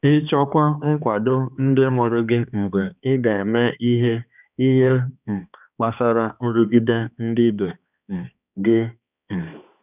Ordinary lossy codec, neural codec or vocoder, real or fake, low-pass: none; codec, 16 kHz, 2 kbps, X-Codec, HuBERT features, trained on balanced general audio; fake; 3.6 kHz